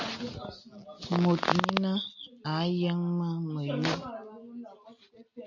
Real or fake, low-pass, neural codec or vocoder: real; 7.2 kHz; none